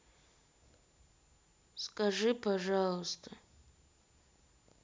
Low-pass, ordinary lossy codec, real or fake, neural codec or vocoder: none; none; real; none